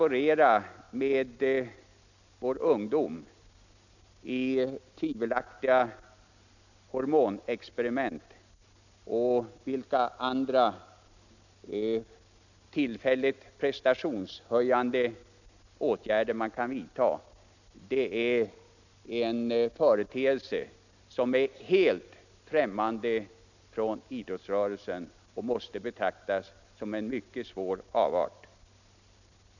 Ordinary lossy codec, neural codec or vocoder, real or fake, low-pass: none; none; real; 7.2 kHz